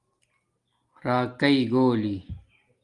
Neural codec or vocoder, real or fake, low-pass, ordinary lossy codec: none; real; 10.8 kHz; Opus, 24 kbps